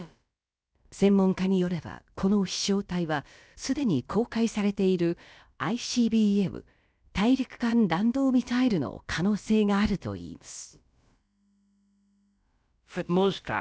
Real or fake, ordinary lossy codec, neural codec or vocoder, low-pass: fake; none; codec, 16 kHz, about 1 kbps, DyCAST, with the encoder's durations; none